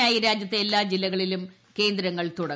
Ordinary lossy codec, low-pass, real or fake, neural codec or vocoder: none; none; real; none